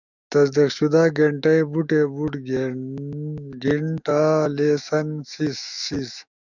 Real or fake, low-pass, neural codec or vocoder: fake; 7.2 kHz; autoencoder, 48 kHz, 128 numbers a frame, DAC-VAE, trained on Japanese speech